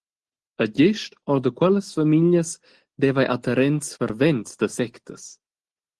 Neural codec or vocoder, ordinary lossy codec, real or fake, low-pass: none; Opus, 16 kbps; real; 10.8 kHz